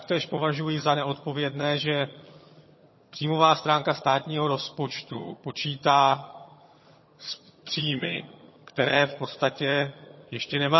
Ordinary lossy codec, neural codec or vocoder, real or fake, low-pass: MP3, 24 kbps; vocoder, 22.05 kHz, 80 mel bands, HiFi-GAN; fake; 7.2 kHz